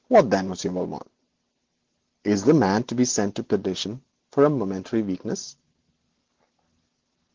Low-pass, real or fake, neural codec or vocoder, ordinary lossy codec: 7.2 kHz; real; none; Opus, 16 kbps